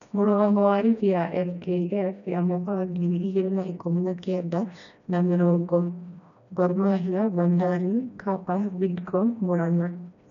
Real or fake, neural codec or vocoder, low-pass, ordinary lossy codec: fake; codec, 16 kHz, 1 kbps, FreqCodec, smaller model; 7.2 kHz; none